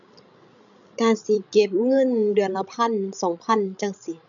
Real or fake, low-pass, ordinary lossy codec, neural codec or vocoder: fake; 7.2 kHz; none; codec, 16 kHz, 16 kbps, FreqCodec, larger model